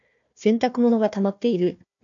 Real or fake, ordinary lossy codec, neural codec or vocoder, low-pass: fake; AAC, 64 kbps; codec, 16 kHz, 1 kbps, FunCodec, trained on Chinese and English, 50 frames a second; 7.2 kHz